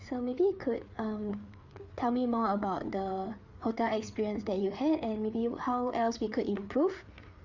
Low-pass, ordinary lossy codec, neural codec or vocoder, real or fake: 7.2 kHz; none; codec, 16 kHz, 4 kbps, FreqCodec, larger model; fake